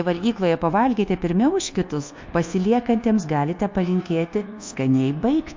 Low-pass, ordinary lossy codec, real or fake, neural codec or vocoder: 7.2 kHz; MP3, 48 kbps; fake; codec, 24 kHz, 1.2 kbps, DualCodec